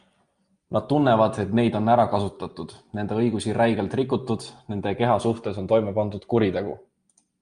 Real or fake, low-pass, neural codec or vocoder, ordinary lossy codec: real; 9.9 kHz; none; Opus, 32 kbps